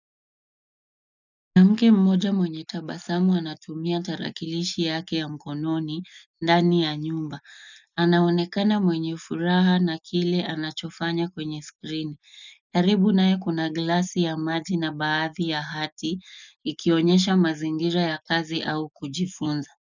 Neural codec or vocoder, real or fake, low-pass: none; real; 7.2 kHz